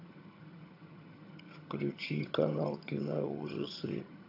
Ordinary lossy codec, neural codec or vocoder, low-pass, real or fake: AAC, 48 kbps; vocoder, 22.05 kHz, 80 mel bands, HiFi-GAN; 5.4 kHz; fake